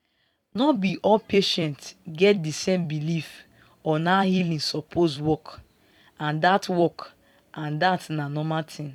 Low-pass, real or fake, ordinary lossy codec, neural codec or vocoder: 19.8 kHz; fake; none; vocoder, 44.1 kHz, 128 mel bands every 256 samples, BigVGAN v2